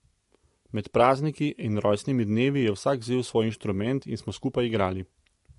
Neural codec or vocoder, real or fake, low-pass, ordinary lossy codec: none; real; 14.4 kHz; MP3, 48 kbps